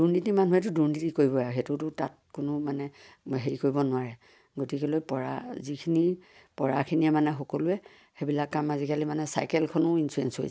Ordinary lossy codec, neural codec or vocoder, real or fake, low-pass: none; none; real; none